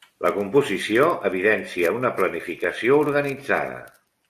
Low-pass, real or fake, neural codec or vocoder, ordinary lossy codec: 14.4 kHz; real; none; AAC, 64 kbps